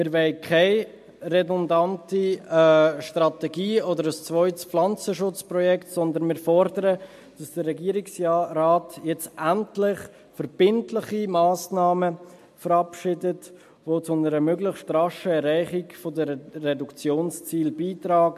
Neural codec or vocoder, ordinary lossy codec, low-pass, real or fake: none; MP3, 64 kbps; 14.4 kHz; real